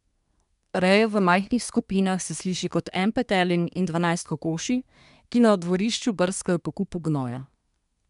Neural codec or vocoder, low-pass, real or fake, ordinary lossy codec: codec, 24 kHz, 1 kbps, SNAC; 10.8 kHz; fake; none